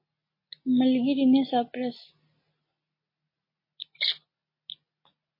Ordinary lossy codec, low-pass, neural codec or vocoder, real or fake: MP3, 24 kbps; 5.4 kHz; none; real